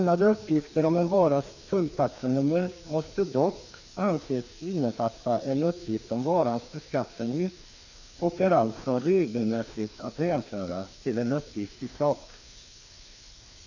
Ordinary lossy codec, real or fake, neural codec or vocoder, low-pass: none; fake; codec, 16 kHz, 2 kbps, FreqCodec, larger model; 7.2 kHz